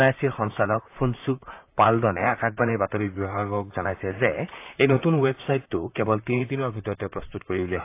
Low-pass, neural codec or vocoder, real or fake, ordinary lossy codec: 3.6 kHz; vocoder, 44.1 kHz, 128 mel bands, Pupu-Vocoder; fake; AAC, 24 kbps